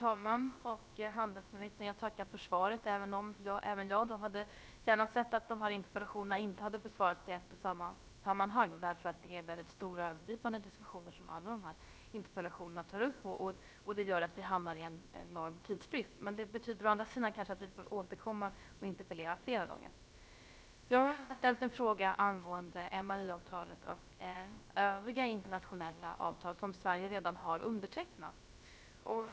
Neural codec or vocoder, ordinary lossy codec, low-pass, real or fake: codec, 16 kHz, about 1 kbps, DyCAST, with the encoder's durations; none; none; fake